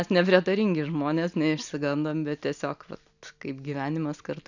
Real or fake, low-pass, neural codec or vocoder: real; 7.2 kHz; none